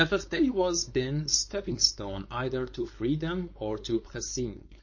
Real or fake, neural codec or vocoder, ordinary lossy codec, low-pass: fake; codec, 16 kHz, 4.8 kbps, FACodec; MP3, 32 kbps; 7.2 kHz